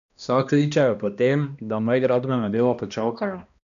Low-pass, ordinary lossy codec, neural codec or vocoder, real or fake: 7.2 kHz; none; codec, 16 kHz, 1 kbps, X-Codec, HuBERT features, trained on balanced general audio; fake